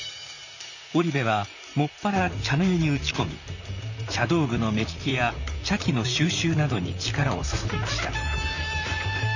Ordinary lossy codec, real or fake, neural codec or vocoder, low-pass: none; fake; vocoder, 44.1 kHz, 128 mel bands, Pupu-Vocoder; 7.2 kHz